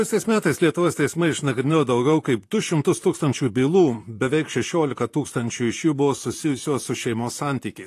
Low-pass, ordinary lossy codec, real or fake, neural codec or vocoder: 14.4 kHz; AAC, 48 kbps; fake; vocoder, 44.1 kHz, 128 mel bands, Pupu-Vocoder